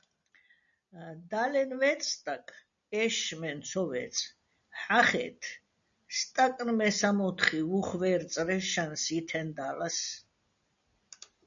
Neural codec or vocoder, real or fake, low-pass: none; real; 7.2 kHz